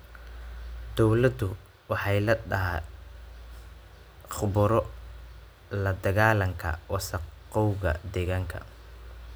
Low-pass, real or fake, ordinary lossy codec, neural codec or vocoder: none; real; none; none